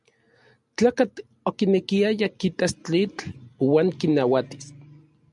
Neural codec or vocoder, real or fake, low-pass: none; real; 10.8 kHz